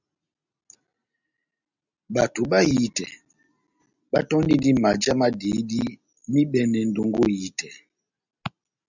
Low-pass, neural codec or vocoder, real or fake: 7.2 kHz; none; real